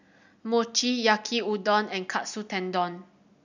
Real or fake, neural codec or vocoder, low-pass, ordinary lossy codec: real; none; 7.2 kHz; none